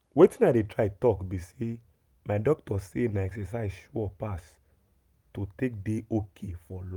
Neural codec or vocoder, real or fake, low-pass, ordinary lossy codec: none; real; 19.8 kHz; Opus, 32 kbps